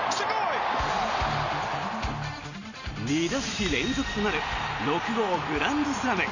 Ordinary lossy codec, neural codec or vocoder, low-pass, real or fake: none; none; 7.2 kHz; real